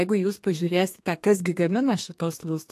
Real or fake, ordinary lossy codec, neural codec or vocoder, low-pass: fake; AAC, 64 kbps; codec, 32 kHz, 1.9 kbps, SNAC; 14.4 kHz